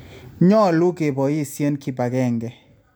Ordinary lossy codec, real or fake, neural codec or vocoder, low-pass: none; real; none; none